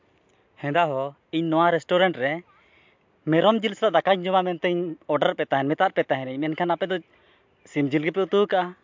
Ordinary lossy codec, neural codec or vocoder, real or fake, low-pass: MP3, 64 kbps; none; real; 7.2 kHz